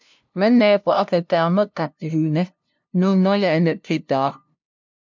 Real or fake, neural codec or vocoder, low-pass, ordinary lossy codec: fake; codec, 16 kHz, 0.5 kbps, FunCodec, trained on LibriTTS, 25 frames a second; 7.2 kHz; MP3, 64 kbps